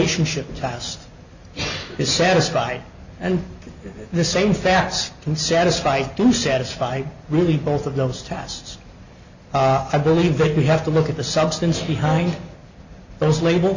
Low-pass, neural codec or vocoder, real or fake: 7.2 kHz; none; real